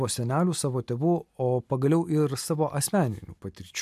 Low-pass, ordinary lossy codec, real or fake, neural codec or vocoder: 14.4 kHz; MP3, 96 kbps; real; none